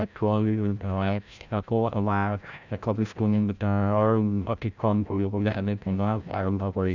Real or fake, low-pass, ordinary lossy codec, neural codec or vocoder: fake; 7.2 kHz; none; codec, 16 kHz, 0.5 kbps, FreqCodec, larger model